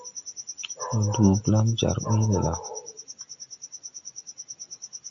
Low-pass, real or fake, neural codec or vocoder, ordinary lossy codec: 7.2 kHz; real; none; AAC, 64 kbps